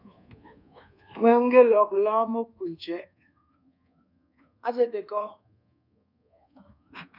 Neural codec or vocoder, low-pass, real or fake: codec, 24 kHz, 1.2 kbps, DualCodec; 5.4 kHz; fake